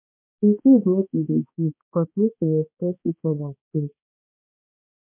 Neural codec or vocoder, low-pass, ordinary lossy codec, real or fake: codec, 16 kHz, 2 kbps, X-Codec, HuBERT features, trained on balanced general audio; 3.6 kHz; MP3, 32 kbps; fake